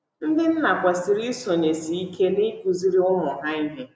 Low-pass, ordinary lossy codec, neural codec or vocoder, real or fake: none; none; none; real